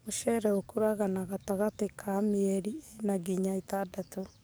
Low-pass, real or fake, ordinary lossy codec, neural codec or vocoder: none; fake; none; codec, 44.1 kHz, 7.8 kbps, DAC